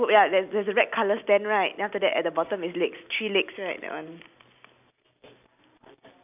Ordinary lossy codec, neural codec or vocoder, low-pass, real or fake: none; none; 3.6 kHz; real